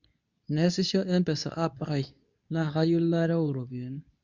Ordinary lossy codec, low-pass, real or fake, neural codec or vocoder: none; 7.2 kHz; fake; codec, 24 kHz, 0.9 kbps, WavTokenizer, medium speech release version 2